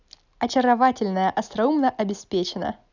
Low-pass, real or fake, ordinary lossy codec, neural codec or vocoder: 7.2 kHz; real; none; none